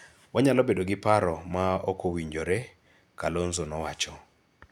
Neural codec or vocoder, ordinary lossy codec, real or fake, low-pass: none; none; real; 19.8 kHz